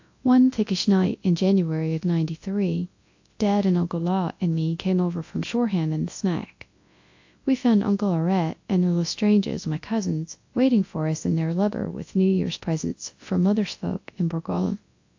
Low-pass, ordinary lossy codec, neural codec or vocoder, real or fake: 7.2 kHz; AAC, 48 kbps; codec, 24 kHz, 0.9 kbps, WavTokenizer, large speech release; fake